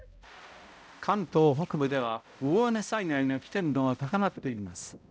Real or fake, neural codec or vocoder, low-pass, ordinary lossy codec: fake; codec, 16 kHz, 0.5 kbps, X-Codec, HuBERT features, trained on balanced general audio; none; none